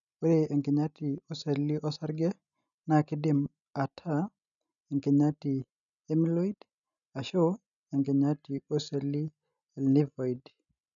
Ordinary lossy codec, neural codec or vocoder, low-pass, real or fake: none; none; 7.2 kHz; real